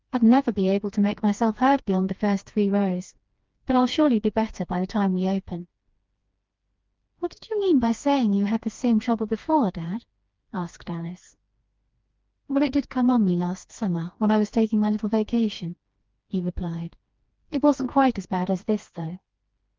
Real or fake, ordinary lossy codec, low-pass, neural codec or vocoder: fake; Opus, 32 kbps; 7.2 kHz; codec, 16 kHz, 2 kbps, FreqCodec, smaller model